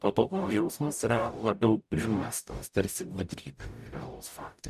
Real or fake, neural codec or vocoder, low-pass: fake; codec, 44.1 kHz, 0.9 kbps, DAC; 14.4 kHz